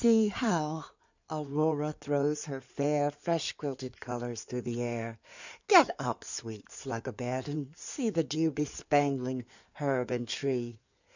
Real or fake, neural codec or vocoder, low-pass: fake; codec, 16 kHz in and 24 kHz out, 2.2 kbps, FireRedTTS-2 codec; 7.2 kHz